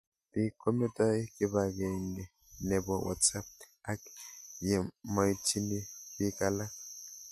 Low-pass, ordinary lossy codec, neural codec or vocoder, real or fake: 14.4 kHz; MP3, 64 kbps; none; real